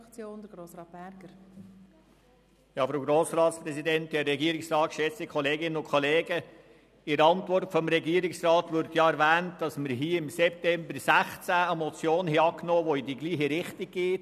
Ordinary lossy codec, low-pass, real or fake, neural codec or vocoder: none; 14.4 kHz; real; none